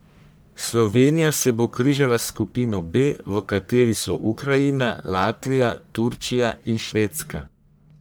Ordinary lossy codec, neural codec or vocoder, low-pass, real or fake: none; codec, 44.1 kHz, 1.7 kbps, Pupu-Codec; none; fake